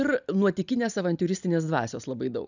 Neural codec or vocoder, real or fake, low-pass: none; real; 7.2 kHz